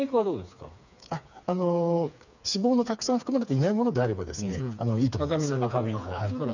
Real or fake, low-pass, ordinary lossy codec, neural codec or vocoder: fake; 7.2 kHz; none; codec, 16 kHz, 4 kbps, FreqCodec, smaller model